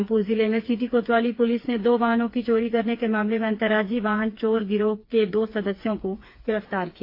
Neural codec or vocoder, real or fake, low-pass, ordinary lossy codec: codec, 16 kHz, 4 kbps, FreqCodec, smaller model; fake; 5.4 kHz; AAC, 32 kbps